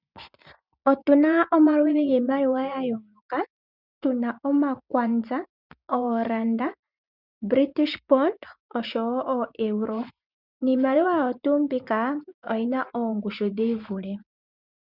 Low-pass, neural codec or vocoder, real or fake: 5.4 kHz; vocoder, 24 kHz, 100 mel bands, Vocos; fake